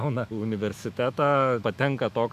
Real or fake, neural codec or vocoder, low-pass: fake; autoencoder, 48 kHz, 128 numbers a frame, DAC-VAE, trained on Japanese speech; 14.4 kHz